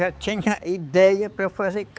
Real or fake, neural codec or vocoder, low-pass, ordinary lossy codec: fake; codec, 16 kHz, 4 kbps, X-Codec, HuBERT features, trained on balanced general audio; none; none